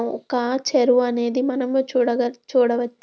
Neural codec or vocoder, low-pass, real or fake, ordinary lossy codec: none; none; real; none